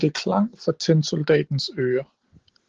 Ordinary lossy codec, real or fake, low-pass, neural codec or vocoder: Opus, 16 kbps; real; 7.2 kHz; none